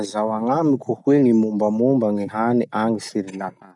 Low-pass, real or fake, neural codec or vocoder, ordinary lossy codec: 10.8 kHz; real; none; none